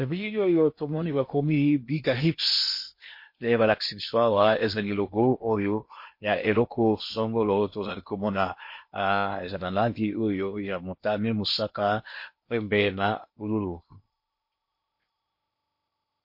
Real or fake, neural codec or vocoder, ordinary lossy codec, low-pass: fake; codec, 16 kHz in and 24 kHz out, 0.8 kbps, FocalCodec, streaming, 65536 codes; MP3, 32 kbps; 5.4 kHz